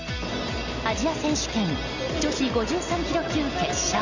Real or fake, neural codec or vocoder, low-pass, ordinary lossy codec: real; none; 7.2 kHz; none